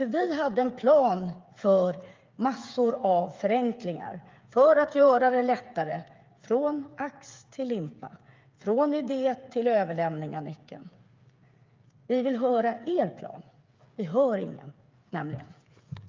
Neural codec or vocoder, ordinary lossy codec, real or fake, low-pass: codec, 16 kHz, 8 kbps, FreqCodec, smaller model; Opus, 24 kbps; fake; 7.2 kHz